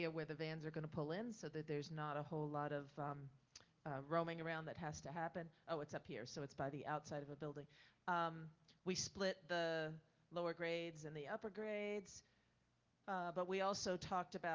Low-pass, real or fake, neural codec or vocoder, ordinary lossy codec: 7.2 kHz; real; none; Opus, 32 kbps